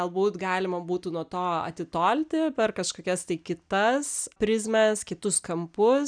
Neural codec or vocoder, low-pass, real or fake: none; 9.9 kHz; real